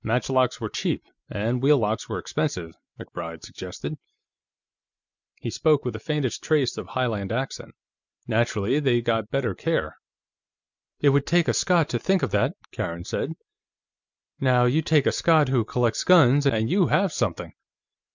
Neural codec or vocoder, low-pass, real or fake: none; 7.2 kHz; real